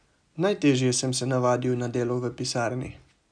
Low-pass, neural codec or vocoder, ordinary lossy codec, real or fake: 9.9 kHz; none; none; real